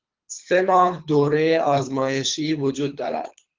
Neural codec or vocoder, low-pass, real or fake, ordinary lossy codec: codec, 24 kHz, 3 kbps, HILCodec; 7.2 kHz; fake; Opus, 32 kbps